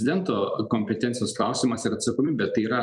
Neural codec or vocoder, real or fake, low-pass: vocoder, 44.1 kHz, 128 mel bands every 256 samples, BigVGAN v2; fake; 10.8 kHz